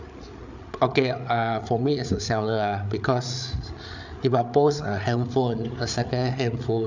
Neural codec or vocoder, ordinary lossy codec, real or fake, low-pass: codec, 16 kHz, 8 kbps, FreqCodec, larger model; none; fake; 7.2 kHz